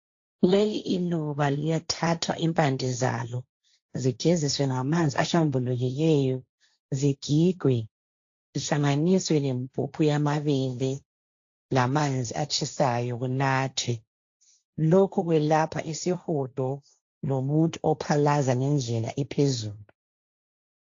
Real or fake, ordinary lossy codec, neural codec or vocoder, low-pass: fake; AAC, 48 kbps; codec, 16 kHz, 1.1 kbps, Voila-Tokenizer; 7.2 kHz